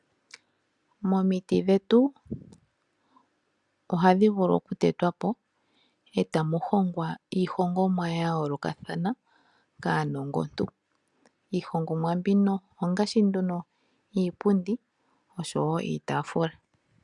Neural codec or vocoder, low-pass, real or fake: none; 10.8 kHz; real